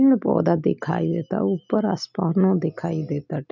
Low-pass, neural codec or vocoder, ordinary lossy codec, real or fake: 7.2 kHz; none; none; real